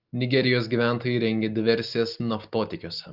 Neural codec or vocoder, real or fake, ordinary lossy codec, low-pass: none; real; Opus, 32 kbps; 5.4 kHz